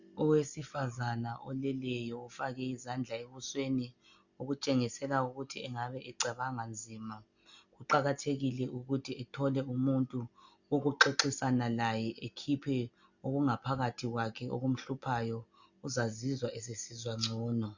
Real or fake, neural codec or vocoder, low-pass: real; none; 7.2 kHz